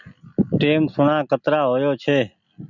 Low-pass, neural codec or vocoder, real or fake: 7.2 kHz; none; real